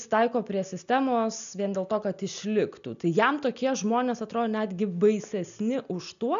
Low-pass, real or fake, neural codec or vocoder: 7.2 kHz; real; none